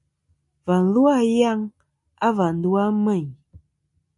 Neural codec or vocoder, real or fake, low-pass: none; real; 10.8 kHz